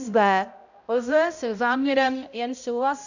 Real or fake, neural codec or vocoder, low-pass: fake; codec, 16 kHz, 0.5 kbps, X-Codec, HuBERT features, trained on balanced general audio; 7.2 kHz